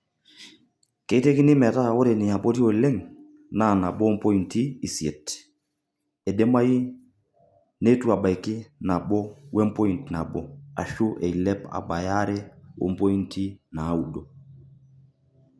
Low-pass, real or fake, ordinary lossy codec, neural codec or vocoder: none; real; none; none